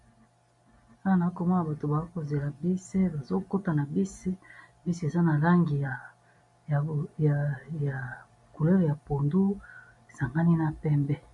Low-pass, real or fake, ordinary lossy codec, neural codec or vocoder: 10.8 kHz; real; MP3, 48 kbps; none